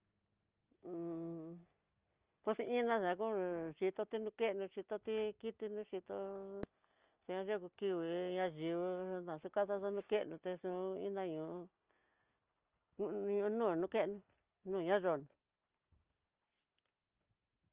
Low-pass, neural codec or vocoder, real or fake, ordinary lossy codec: 3.6 kHz; none; real; Opus, 24 kbps